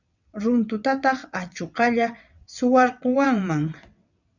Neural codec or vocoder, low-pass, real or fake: vocoder, 22.05 kHz, 80 mel bands, WaveNeXt; 7.2 kHz; fake